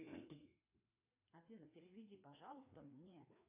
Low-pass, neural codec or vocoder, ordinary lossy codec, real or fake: 3.6 kHz; codec, 16 kHz, 2 kbps, FreqCodec, larger model; AAC, 24 kbps; fake